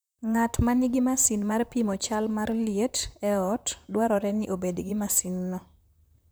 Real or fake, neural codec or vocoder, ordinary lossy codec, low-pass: fake; vocoder, 44.1 kHz, 128 mel bands, Pupu-Vocoder; none; none